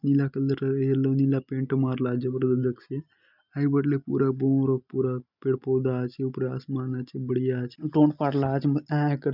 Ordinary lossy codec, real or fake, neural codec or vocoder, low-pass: none; real; none; 5.4 kHz